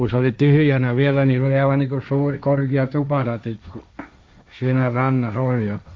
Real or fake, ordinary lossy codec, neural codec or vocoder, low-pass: fake; none; codec, 16 kHz, 1.1 kbps, Voila-Tokenizer; none